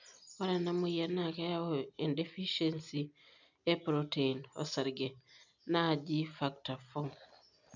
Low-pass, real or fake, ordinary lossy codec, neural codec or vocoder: 7.2 kHz; real; none; none